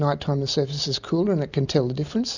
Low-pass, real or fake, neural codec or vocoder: 7.2 kHz; real; none